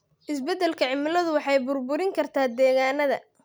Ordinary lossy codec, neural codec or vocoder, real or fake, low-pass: none; none; real; none